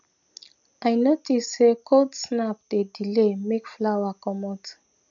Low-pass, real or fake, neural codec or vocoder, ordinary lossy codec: 7.2 kHz; real; none; none